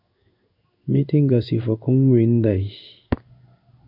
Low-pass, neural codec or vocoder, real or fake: 5.4 kHz; codec, 16 kHz in and 24 kHz out, 1 kbps, XY-Tokenizer; fake